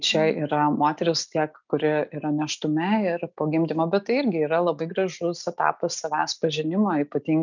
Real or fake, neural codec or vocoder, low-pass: real; none; 7.2 kHz